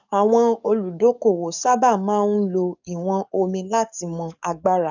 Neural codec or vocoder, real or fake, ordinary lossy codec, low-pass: codec, 44.1 kHz, 7.8 kbps, DAC; fake; none; 7.2 kHz